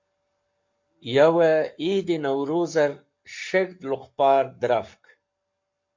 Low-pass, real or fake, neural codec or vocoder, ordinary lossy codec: 7.2 kHz; fake; codec, 44.1 kHz, 7.8 kbps, Pupu-Codec; MP3, 48 kbps